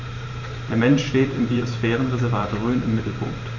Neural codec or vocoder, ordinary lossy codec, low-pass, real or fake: vocoder, 44.1 kHz, 128 mel bands every 512 samples, BigVGAN v2; none; 7.2 kHz; fake